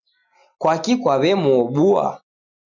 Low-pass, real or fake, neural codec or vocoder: 7.2 kHz; real; none